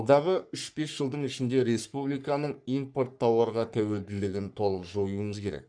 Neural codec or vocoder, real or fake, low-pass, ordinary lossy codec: codec, 44.1 kHz, 3.4 kbps, Pupu-Codec; fake; 9.9 kHz; none